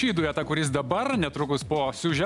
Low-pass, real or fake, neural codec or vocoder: 10.8 kHz; real; none